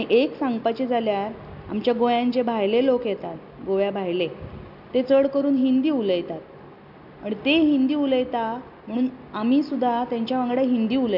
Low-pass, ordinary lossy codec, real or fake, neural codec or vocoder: 5.4 kHz; none; real; none